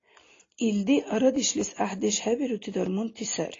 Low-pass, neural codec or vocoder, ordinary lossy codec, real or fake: 7.2 kHz; none; AAC, 32 kbps; real